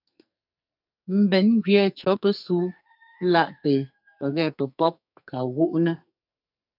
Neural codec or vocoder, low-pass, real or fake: codec, 44.1 kHz, 2.6 kbps, SNAC; 5.4 kHz; fake